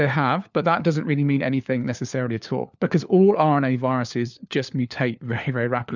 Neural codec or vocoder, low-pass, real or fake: codec, 16 kHz, 4 kbps, FunCodec, trained on LibriTTS, 50 frames a second; 7.2 kHz; fake